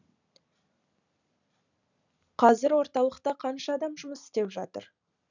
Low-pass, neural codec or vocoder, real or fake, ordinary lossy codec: 7.2 kHz; none; real; none